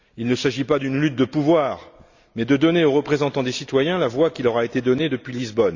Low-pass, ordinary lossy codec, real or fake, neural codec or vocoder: 7.2 kHz; Opus, 64 kbps; real; none